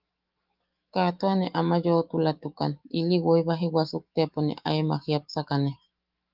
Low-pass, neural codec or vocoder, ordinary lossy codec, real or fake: 5.4 kHz; autoencoder, 48 kHz, 128 numbers a frame, DAC-VAE, trained on Japanese speech; Opus, 32 kbps; fake